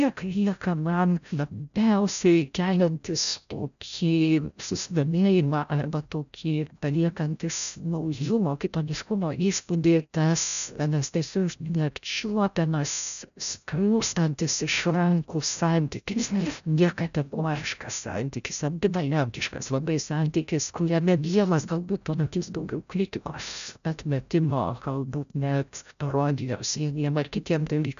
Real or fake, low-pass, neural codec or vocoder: fake; 7.2 kHz; codec, 16 kHz, 0.5 kbps, FreqCodec, larger model